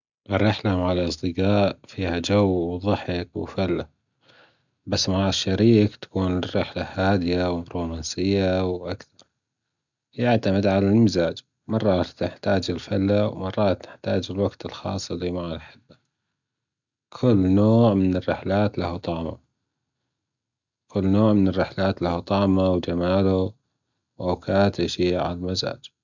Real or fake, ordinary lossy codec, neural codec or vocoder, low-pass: real; none; none; 7.2 kHz